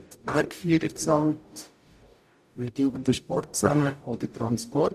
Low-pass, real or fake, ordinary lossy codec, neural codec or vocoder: 14.4 kHz; fake; none; codec, 44.1 kHz, 0.9 kbps, DAC